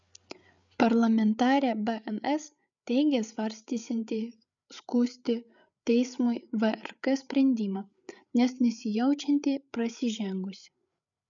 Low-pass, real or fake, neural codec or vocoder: 7.2 kHz; fake; codec, 16 kHz, 8 kbps, FreqCodec, larger model